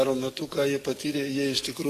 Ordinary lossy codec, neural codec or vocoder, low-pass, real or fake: AAC, 48 kbps; codec, 44.1 kHz, 7.8 kbps, DAC; 14.4 kHz; fake